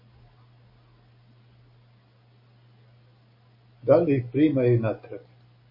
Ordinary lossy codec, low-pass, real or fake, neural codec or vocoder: MP3, 24 kbps; 5.4 kHz; real; none